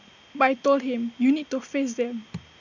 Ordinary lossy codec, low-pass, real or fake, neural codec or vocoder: none; 7.2 kHz; real; none